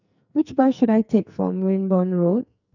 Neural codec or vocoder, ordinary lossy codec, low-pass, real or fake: codec, 44.1 kHz, 2.6 kbps, SNAC; none; 7.2 kHz; fake